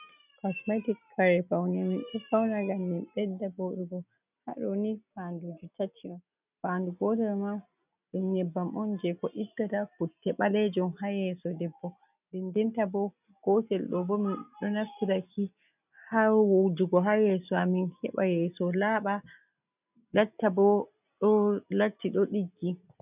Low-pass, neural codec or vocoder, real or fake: 3.6 kHz; none; real